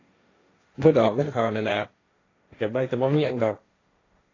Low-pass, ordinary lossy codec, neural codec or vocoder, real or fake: 7.2 kHz; AAC, 32 kbps; codec, 16 kHz, 1.1 kbps, Voila-Tokenizer; fake